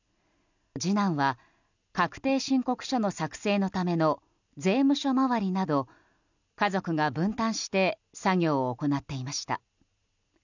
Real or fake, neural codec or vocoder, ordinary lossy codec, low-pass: real; none; none; 7.2 kHz